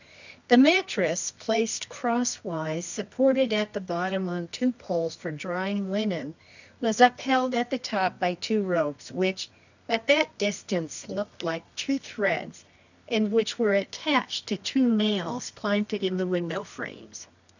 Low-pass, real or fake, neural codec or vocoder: 7.2 kHz; fake; codec, 24 kHz, 0.9 kbps, WavTokenizer, medium music audio release